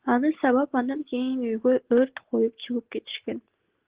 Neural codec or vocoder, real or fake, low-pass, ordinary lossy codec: none; real; 3.6 kHz; Opus, 16 kbps